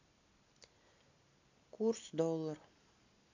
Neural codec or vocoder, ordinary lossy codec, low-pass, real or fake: none; AAC, 48 kbps; 7.2 kHz; real